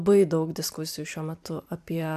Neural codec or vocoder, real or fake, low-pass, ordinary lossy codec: vocoder, 44.1 kHz, 128 mel bands every 512 samples, BigVGAN v2; fake; 14.4 kHz; AAC, 96 kbps